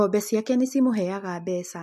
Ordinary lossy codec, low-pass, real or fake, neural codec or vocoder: MP3, 64 kbps; 14.4 kHz; real; none